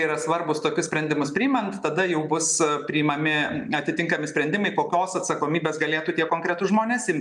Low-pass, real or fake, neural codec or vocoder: 10.8 kHz; real; none